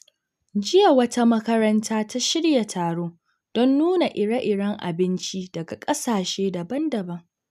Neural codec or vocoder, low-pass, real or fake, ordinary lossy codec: none; 14.4 kHz; real; none